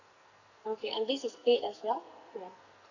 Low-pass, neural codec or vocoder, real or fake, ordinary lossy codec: 7.2 kHz; codec, 44.1 kHz, 2.6 kbps, SNAC; fake; none